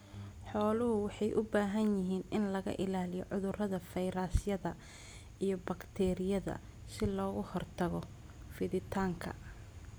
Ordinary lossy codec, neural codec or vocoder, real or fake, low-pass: none; none; real; none